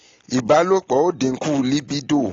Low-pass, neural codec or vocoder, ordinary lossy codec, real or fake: 7.2 kHz; none; AAC, 32 kbps; real